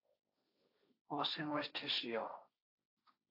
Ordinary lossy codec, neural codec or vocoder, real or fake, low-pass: AAC, 32 kbps; codec, 16 kHz, 1.1 kbps, Voila-Tokenizer; fake; 5.4 kHz